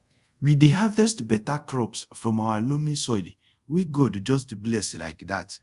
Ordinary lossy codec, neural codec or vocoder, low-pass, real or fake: Opus, 64 kbps; codec, 24 kHz, 0.5 kbps, DualCodec; 10.8 kHz; fake